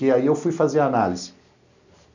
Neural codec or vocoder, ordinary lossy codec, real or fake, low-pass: none; none; real; 7.2 kHz